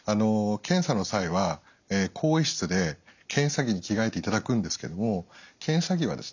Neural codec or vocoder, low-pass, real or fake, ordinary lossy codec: none; 7.2 kHz; real; none